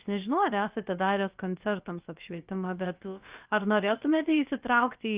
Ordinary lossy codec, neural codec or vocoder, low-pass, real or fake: Opus, 32 kbps; codec, 16 kHz, about 1 kbps, DyCAST, with the encoder's durations; 3.6 kHz; fake